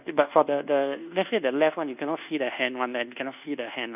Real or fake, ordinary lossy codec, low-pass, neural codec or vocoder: fake; none; 3.6 kHz; codec, 24 kHz, 1.2 kbps, DualCodec